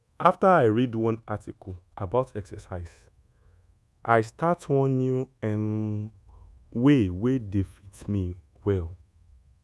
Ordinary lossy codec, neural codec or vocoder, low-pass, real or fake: none; codec, 24 kHz, 1.2 kbps, DualCodec; none; fake